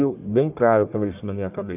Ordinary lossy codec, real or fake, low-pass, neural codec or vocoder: none; fake; 3.6 kHz; codec, 44.1 kHz, 1.7 kbps, Pupu-Codec